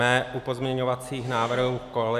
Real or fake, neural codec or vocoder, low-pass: real; none; 14.4 kHz